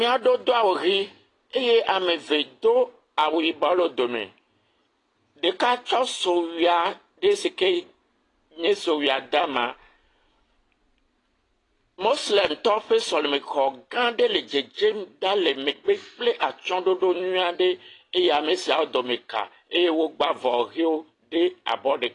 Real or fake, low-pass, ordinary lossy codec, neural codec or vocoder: real; 10.8 kHz; AAC, 32 kbps; none